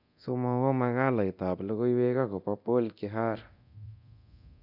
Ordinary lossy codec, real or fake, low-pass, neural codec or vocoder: none; fake; 5.4 kHz; codec, 24 kHz, 0.9 kbps, DualCodec